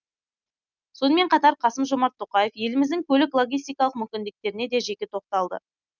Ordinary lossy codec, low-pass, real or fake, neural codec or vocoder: none; 7.2 kHz; real; none